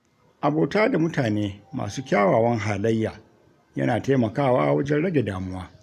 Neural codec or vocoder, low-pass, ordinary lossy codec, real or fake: none; 14.4 kHz; none; real